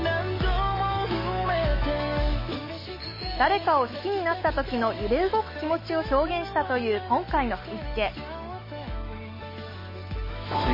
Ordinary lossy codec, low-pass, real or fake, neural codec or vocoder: MP3, 24 kbps; 5.4 kHz; fake; autoencoder, 48 kHz, 128 numbers a frame, DAC-VAE, trained on Japanese speech